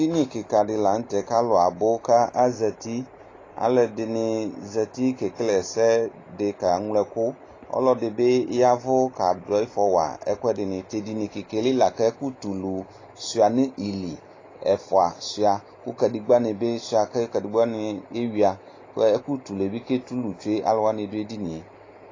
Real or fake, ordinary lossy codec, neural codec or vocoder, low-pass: real; AAC, 32 kbps; none; 7.2 kHz